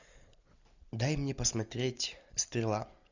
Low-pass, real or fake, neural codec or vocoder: 7.2 kHz; real; none